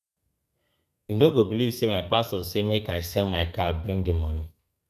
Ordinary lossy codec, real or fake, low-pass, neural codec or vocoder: none; fake; 14.4 kHz; codec, 32 kHz, 1.9 kbps, SNAC